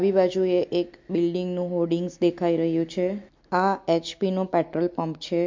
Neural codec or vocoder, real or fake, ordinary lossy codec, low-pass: none; real; MP3, 48 kbps; 7.2 kHz